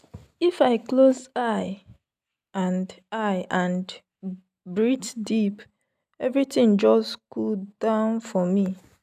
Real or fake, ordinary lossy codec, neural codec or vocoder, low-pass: real; none; none; 14.4 kHz